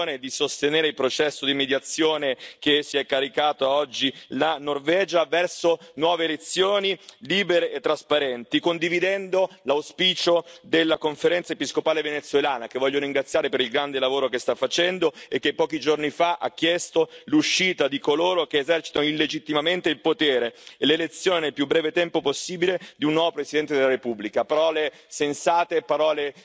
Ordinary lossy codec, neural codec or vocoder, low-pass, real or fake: none; none; none; real